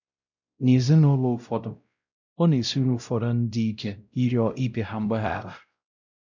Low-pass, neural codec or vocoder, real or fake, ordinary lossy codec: 7.2 kHz; codec, 16 kHz, 0.5 kbps, X-Codec, WavLM features, trained on Multilingual LibriSpeech; fake; none